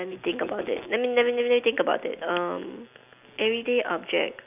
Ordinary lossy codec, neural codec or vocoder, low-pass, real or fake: none; none; 3.6 kHz; real